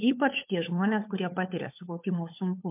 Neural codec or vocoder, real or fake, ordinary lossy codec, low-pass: codec, 16 kHz, 4 kbps, FunCodec, trained on LibriTTS, 50 frames a second; fake; MP3, 32 kbps; 3.6 kHz